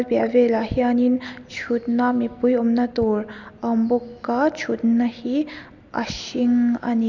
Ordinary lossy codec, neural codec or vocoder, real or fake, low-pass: none; none; real; 7.2 kHz